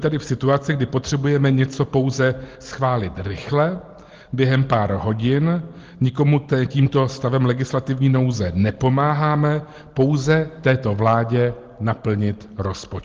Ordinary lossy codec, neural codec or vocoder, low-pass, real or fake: Opus, 16 kbps; none; 7.2 kHz; real